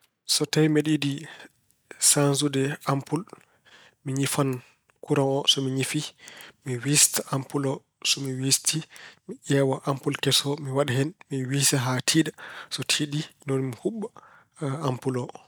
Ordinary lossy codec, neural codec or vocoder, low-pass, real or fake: none; none; none; real